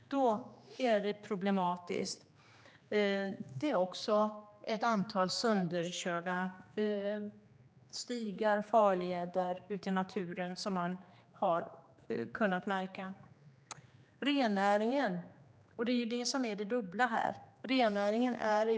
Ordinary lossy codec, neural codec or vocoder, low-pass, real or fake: none; codec, 16 kHz, 2 kbps, X-Codec, HuBERT features, trained on general audio; none; fake